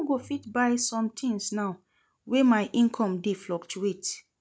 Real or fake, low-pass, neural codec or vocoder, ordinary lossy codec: real; none; none; none